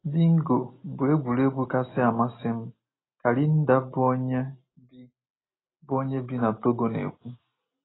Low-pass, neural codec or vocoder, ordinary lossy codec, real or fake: 7.2 kHz; none; AAC, 16 kbps; real